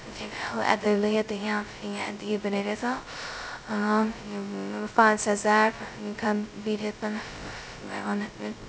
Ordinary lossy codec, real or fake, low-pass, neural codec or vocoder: none; fake; none; codec, 16 kHz, 0.2 kbps, FocalCodec